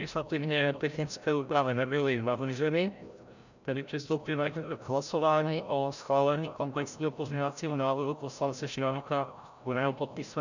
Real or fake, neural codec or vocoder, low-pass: fake; codec, 16 kHz, 0.5 kbps, FreqCodec, larger model; 7.2 kHz